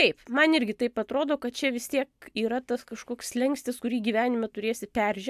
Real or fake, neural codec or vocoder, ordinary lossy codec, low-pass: real; none; AAC, 96 kbps; 14.4 kHz